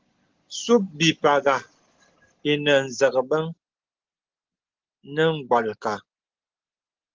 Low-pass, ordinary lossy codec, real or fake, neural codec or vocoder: 7.2 kHz; Opus, 16 kbps; real; none